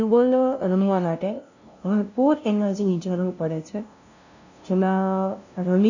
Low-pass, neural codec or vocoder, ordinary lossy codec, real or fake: 7.2 kHz; codec, 16 kHz, 0.5 kbps, FunCodec, trained on LibriTTS, 25 frames a second; none; fake